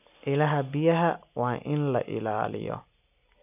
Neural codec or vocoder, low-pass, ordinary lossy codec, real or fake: none; 3.6 kHz; none; real